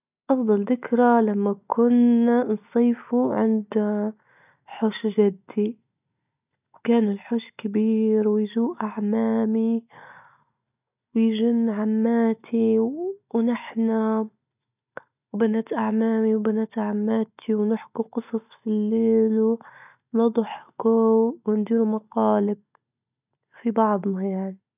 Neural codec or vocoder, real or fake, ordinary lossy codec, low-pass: none; real; none; 3.6 kHz